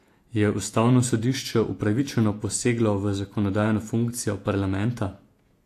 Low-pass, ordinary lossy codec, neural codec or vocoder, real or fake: 14.4 kHz; AAC, 64 kbps; vocoder, 48 kHz, 128 mel bands, Vocos; fake